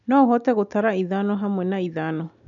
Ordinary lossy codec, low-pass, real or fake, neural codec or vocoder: none; 7.2 kHz; real; none